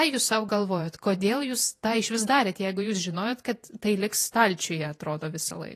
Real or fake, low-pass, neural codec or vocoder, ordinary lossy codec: fake; 14.4 kHz; vocoder, 48 kHz, 128 mel bands, Vocos; AAC, 48 kbps